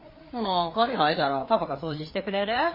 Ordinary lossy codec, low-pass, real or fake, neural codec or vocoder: MP3, 24 kbps; 5.4 kHz; fake; codec, 16 kHz, 4 kbps, FreqCodec, larger model